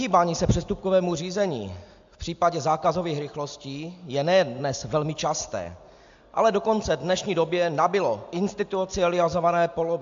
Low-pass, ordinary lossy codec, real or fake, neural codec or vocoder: 7.2 kHz; AAC, 64 kbps; real; none